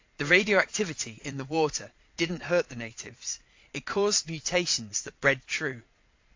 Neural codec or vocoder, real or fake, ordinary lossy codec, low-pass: vocoder, 22.05 kHz, 80 mel bands, Vocos; fake; AAC, 48 kbps; 7.2 kHz